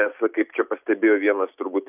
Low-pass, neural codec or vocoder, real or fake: 3.6 kHz; none; real